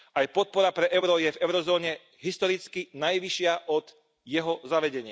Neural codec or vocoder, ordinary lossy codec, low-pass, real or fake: none; none; none; real